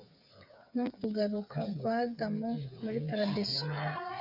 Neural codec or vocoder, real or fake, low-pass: codec, 16 kHz, 8 kbps, FreqCodec, smaller model; fake; 5.4 kHz